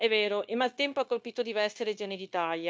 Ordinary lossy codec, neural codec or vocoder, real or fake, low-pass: none; codec, 16 kHz, 0.9 kbps, LongCat-Audio-Codec; fake; none